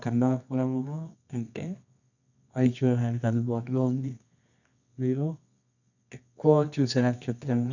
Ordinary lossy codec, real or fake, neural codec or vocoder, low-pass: none; fake; codec, 24 kHz, 0.9 kbps, WavTokenizer, medium music audio release; 7.2 kHz